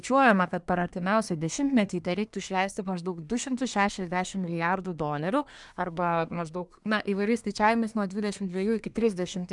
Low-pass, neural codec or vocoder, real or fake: 10.8 kHz; codec, 24 kHz, 1 kbps, SNAC; fake